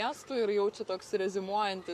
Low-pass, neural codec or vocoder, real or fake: 14.4 kHz; codec, 44.1 kHz, 7.8 kbps, Pupu-Codec; fake